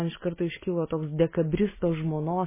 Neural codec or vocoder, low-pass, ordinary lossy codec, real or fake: none; 3.6 kHz; MP3, 16 kbps; real